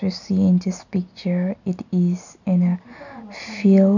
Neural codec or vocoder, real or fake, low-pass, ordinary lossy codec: none; real; 7.2 kHz; none